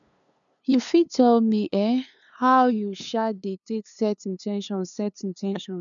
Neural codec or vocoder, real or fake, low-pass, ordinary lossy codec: codec, 16 kHz, 2 kbps, FunCodec, trained on LibriTTS, 25 frames a second; fake; 7.2 kHz; none